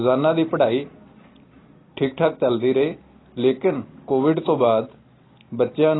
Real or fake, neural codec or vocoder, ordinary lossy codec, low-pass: real; none; AAC, 16 kbps; 7.2 kHz